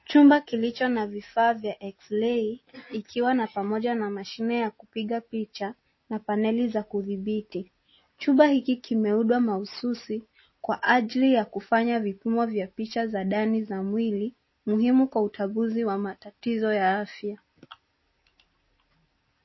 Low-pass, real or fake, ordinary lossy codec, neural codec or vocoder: 7.2 kHz; real; MP3, 24 kbps; none